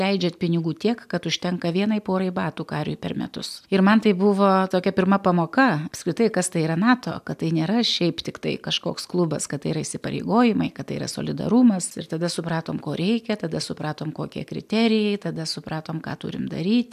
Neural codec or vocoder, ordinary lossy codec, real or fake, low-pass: none; AAC, 96 kbps; real; 14.4 kHz